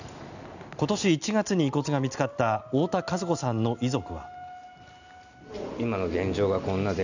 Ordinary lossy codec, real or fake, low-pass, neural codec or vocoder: none; real; 7.2 kHz; none